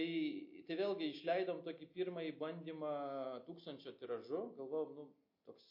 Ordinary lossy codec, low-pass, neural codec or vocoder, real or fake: MP3, 32 kbps; 5.4 kHz; none; real